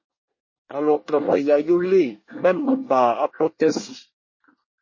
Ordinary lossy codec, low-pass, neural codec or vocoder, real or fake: MP3, 32 kbps; 7.2 kHz; codec, 24 kHz, 1 kbps, SNAC; fake